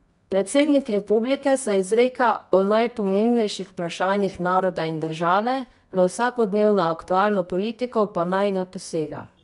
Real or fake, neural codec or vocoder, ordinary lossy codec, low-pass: fake; codec, 24 kHz, 0.9 kbps, WavTokenizer, medium music audio release; none; 10.8 kHz